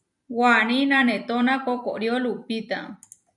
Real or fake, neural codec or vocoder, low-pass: fake; vocoder, 44.1 kHz, 128 mel bands every 256 samples, BigVGAN v2; 10.8 kHz